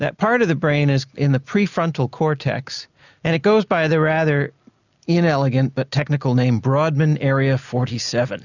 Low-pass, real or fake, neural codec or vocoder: 7.2 kHz; real; none